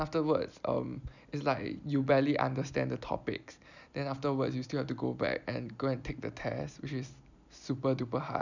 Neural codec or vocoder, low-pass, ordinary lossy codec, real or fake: none; 7.2 kHz; none; real